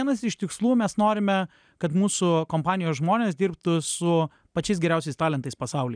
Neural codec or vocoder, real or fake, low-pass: none; real; 9.9 kHz